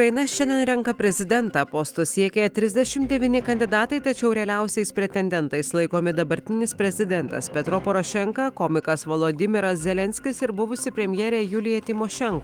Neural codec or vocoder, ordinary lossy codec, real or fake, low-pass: autoencoder, 48 kHz, 128 numbers a frame, DAC-VAE, trained on Japanese speech; Opus, 24 kbps; fake; 19.8 kHz